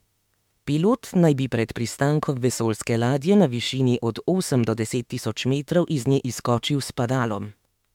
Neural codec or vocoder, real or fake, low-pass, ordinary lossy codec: autoencoder, 48 kHz, 32 numbers a frame, DAC-VAE, trained on Japanese speech; fake; 19.8 kHz; MP3, 96 kbps